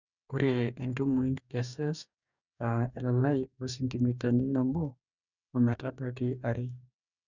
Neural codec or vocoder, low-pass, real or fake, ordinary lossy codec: codec, 44.1 kHz, 2.6 kbps, DAC; 7.2 kHz; fake; none